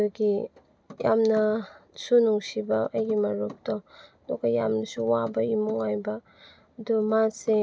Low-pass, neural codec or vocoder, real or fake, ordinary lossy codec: none; none; real; none